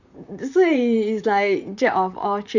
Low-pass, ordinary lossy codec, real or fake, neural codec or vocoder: 7.2 kHz; none; fake; vocoder, 44.1 kHz, 128 mel bands, Pupu-Vocoder